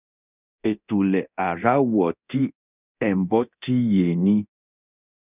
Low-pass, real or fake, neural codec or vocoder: 3.6 kHz; fake; codec, 24 kHz, 0.9 kbps, DualCodec